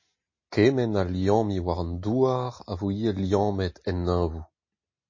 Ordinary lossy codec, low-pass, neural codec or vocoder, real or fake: MP3, 32 kbps; 7.2 kHz; none; real